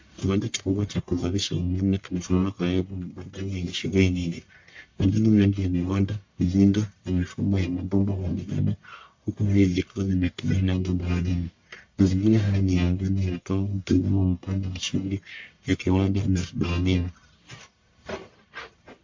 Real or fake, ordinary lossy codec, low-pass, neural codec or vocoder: fake; MP3, 48 kbps; 7.2 kHz; codec, 44.1 kHz, 1.7 kbps, Pupu-Codec